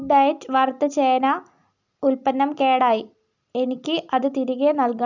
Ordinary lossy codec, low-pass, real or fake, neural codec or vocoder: none; 7.2 kHz; real; none